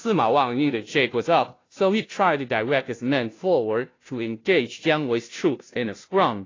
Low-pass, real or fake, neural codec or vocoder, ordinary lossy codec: 7.2 kHz; fake; codec, 16 kHz, 0.5 kbps, FunCodec, trained on Chinese and English, 25 frames a second; AAC, 32 kbps